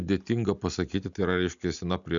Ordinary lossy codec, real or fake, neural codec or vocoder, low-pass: MP3, 96 kbps; real; none; 7.2 kHz